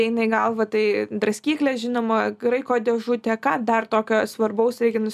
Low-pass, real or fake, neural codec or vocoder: 14.4 kHz; real; none